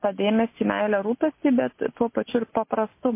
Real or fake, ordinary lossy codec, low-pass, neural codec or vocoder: real; MP3, 24 kbps; 3.6 kHz; none